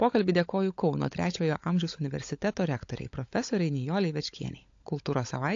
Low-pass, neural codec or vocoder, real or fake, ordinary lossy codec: 7.2 kHz; codec, 16 kHz, 16 kbps, FunCodec, trained on LibriTTS, 50 frames a second; fake; AAC, 48 kbps